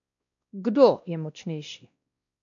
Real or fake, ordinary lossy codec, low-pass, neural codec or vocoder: fake; AAC, 48 kbps; 7.2 kHz; codec, 16 kHz, 1 kbps, X-Codec, WavLM features, trained on Multilingual LibriSpeech